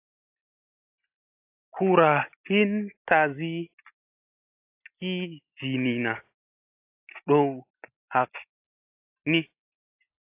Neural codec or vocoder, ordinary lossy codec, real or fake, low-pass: none; AAC, 24 kbps; real; 3.6 kHz